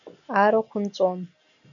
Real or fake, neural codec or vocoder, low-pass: real; none; 7.2 kHz